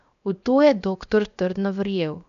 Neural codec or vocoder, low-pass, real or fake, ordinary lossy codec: codec, 16 kHz, 0.7 kbps, FocalCodec; 7.2 kHz; fake; none